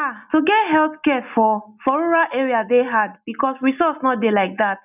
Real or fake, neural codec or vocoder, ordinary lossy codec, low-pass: real; none; none; 3.6 kHz